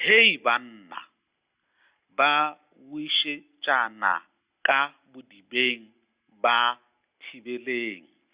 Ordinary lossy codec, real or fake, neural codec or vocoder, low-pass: Opus, 64 kbps; real; none; 3.6 kHz